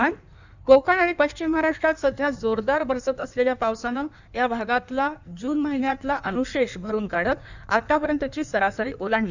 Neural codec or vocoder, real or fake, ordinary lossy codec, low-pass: codec, 16 kHz in and 24 kHz out, 1.1 kbps, FireRedTTS-2 codec; fake; none; 7.2 kHz